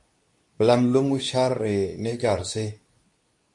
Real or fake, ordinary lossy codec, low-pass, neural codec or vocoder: fake; MP3, 48 kbps; 10.8 kHz; codec, 24 kHz, 0.9 kbps, WavTokenizer, medium speech release version 2